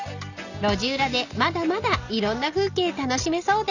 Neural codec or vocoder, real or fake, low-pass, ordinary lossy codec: none; real; 7.2 kHz; none